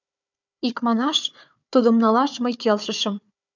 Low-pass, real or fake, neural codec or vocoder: 7.2 kHz; fake; codec, 16 kHz, 4 kbps, FunCodec, trained on Chinese and English, 50 frames a second